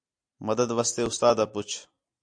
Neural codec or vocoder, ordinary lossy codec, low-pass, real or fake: none; AAC, 64 kbps; 9.9 kHz; real